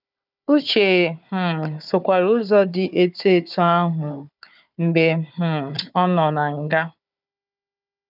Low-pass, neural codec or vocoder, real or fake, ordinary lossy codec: 5.4 kHz; codec, 16 kHz, 4 kbps, FunCodec, trained on Chinese and English, 50 frames a second; fake; none